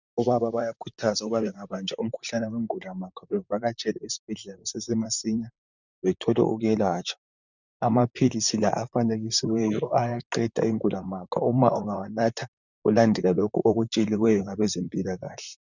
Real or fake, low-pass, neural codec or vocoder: fake; 7.2 kHz; vocoder, 44.1 kHz, 128 mel bands, Pupu-Vocoder